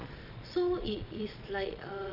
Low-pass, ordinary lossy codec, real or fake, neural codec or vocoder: 5.4 kHz; AAC, 48 kbps; fake; vocoder, 22.05 kHz, 80 mel bands, Vocos